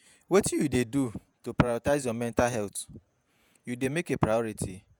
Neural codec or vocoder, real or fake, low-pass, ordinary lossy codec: vocoder, 48 kHz, 128 mel bands, Vocos; fake; none; none